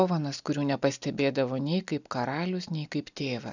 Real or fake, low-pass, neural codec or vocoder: real; 7.2 kHz; none